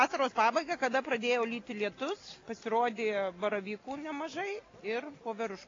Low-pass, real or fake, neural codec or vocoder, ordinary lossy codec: 7.2 kHz; real; none; AAC, 32 kbps